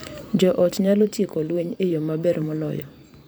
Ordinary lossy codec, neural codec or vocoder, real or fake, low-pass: none; vocoder, 44.1 kHz, 128 mel bands every 512 samples, BigVGAN v2; fake; none